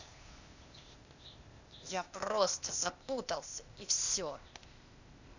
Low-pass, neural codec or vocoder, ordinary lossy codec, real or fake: 7.2 kHz; codec, 16 kHz, 0.8 kbps, ZipCodec; none; fake